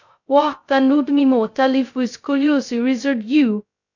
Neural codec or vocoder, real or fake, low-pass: codec, 16 kHz, 0.2 kbps, FocalCodec; fake; 7.2 kHz